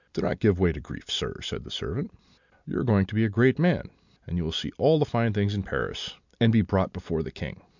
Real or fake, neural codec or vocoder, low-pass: real; none; 7.2 kHz